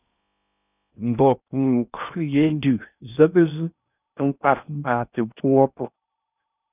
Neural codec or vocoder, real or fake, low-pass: codec, 16 kHz in and 24 kHz out, 0.6 kbps, FocalCodec, streaming, 4096 codes; fake; 3.6 kHz